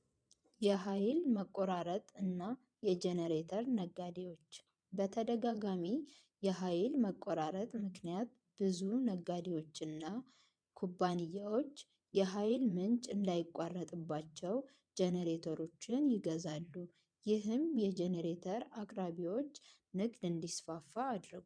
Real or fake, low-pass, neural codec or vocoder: fake; 9.9 kHz; vocoder, 22.05 kHz, 80 mel bands, Vocos